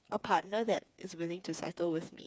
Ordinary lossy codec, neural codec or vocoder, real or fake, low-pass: none; codec, 16 kHz, 4 kbps, FreqCodec, smaller model; fake; none